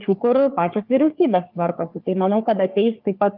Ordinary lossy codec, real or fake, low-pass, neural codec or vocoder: Opus, 24 kbps; fake; 5.4 kHz; codec, 44.1 kHz, 3.4 kbps, Pupu-Codec